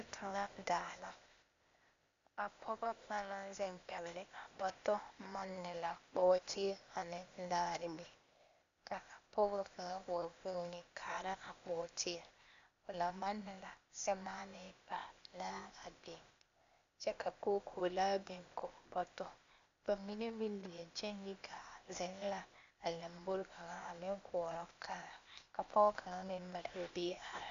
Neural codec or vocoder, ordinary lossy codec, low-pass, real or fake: codec, 16 kHz, 0.8 kbps, ZipCodec; MP3, 64 kbps; 7.2 kHz; fake